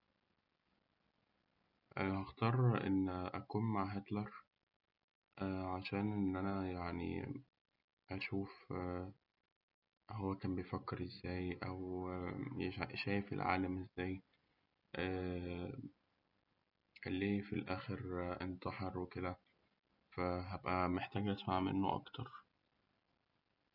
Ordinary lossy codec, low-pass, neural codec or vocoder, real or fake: none; 5.4 kHz; none; real